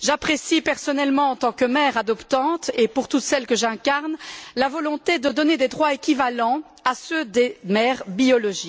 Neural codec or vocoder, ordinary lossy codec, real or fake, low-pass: none; none; real; none